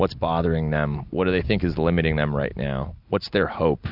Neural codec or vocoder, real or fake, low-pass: none; real; 5.4 kHz